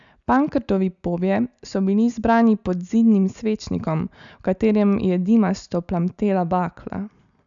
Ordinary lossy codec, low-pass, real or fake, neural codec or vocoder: MP3, 96 kbps; 7.2 kHz; real; none